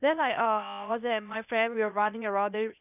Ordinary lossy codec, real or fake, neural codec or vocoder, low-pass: none; fake; codec, 16 kHz, 0.8 kbps, ZipCodec; 3.6 kHz